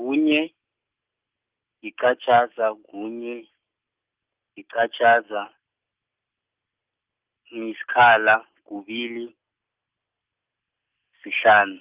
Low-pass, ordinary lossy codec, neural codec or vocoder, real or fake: 3.6 kHz; Opus, 32 kbps; none; real